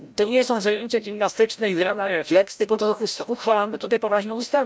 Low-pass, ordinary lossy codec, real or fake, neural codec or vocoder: none; none; fake; codec, 16 kHz, 0.5 kbps, FreqCodec, larger model